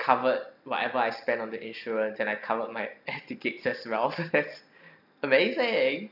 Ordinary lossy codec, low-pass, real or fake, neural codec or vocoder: none; 5.4 kHz; real; none